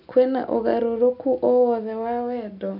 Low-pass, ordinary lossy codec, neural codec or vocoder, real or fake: 5.4 kHz; none; none; real